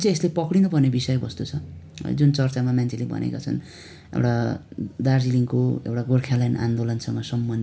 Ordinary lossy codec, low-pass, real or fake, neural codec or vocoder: none; none; real; none